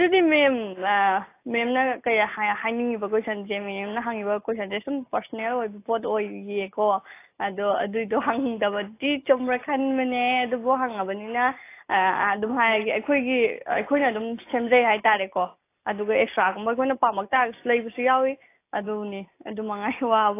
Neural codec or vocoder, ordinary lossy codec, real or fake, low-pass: none; AAC, 24 kbps; real; 3.6 kHz